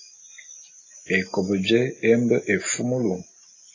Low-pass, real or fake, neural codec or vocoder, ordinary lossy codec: 7.2 kHz; real; none; AAC, 32 kbps